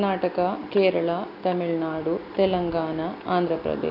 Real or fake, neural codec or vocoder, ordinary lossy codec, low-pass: real; none; AAC, 48 kbps; 5.4 kHz